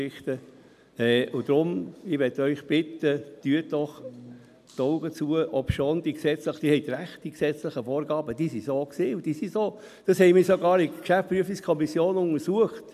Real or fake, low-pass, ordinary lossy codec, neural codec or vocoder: real; 14.4 kHz; none; none